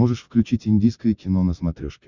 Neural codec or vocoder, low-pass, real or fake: none; 7.2 kHz; real